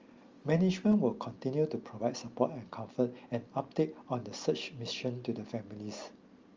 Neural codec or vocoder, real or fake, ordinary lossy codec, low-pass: none; real; Opus, 32 kbps; 7.2 kHz